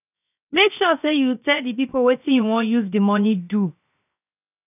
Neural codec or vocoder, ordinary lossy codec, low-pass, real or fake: codec, 16 kHz, 0.7 kbps, FocalCodec; none; 3.6 kHz; fake